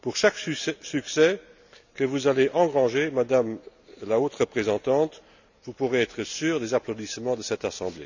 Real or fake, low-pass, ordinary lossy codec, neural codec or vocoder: real; 7.2 kHz; none; none